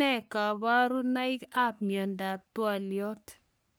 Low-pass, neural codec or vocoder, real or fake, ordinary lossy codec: none; codec, 44.1 kHz, 3.4 kbps, Pupu-Codec; fake; none